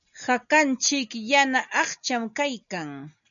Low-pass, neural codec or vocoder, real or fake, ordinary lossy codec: 7.2 kHz; none; real; MP3, 96 kbps